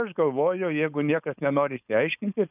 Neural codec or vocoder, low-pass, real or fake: codec, 16 kHz, 4.8 kbps, FACodec; 3.6 kHz; fake